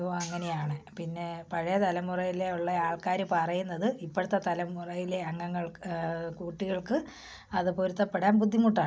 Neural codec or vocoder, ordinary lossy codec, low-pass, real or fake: none; none; none; real